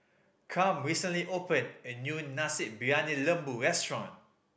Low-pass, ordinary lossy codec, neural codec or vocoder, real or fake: none; none; none; real